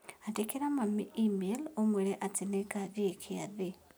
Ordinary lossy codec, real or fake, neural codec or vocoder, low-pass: none; real; none; none